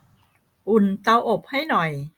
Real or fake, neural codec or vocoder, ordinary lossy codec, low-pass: real; none; Opus, 64 kbps; 19.8 kHz